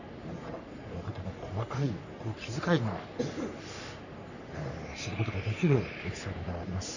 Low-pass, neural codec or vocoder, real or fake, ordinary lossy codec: 7.2 kHz; codec, 44.1 kHz, 3.4 kbps, Pupu-Codec; fake; none